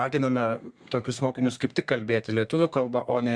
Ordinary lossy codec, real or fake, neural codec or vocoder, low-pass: Opus, 64 kbps; fake; codec, 32 kHz, 1.9 kbps, SNAC; 9.9 kHz